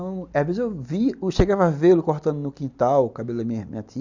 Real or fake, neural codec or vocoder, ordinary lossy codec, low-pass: real; none; Opus, 64 kbps; 7.2 kHz